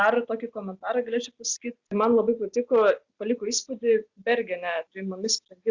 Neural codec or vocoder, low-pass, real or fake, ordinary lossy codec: none; 7.2 kHz; real; Opus, 64 kbps